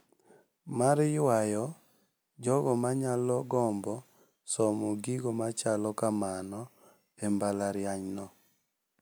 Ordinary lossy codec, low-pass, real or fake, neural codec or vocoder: none; none; real; none